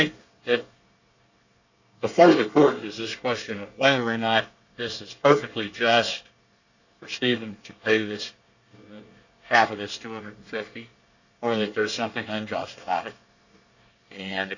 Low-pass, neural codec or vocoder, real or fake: 7.2 kHz; codec, 24 kHz, 1 kbps, SNAC; fake